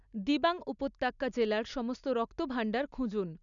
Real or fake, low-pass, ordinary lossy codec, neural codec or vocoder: real; 7.2 kHz; none; none